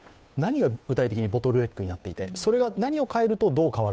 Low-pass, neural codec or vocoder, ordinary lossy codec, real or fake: none; codec, 16 kHz, 2 kbps, FunCodec, trained on Chinese and English, 25 frames a second; none; fake